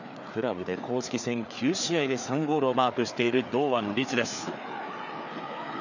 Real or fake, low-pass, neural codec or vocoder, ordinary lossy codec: fake; 7.2 kHz; codec, 16 kHz, 4 kbps, FreqCodec, larger model; none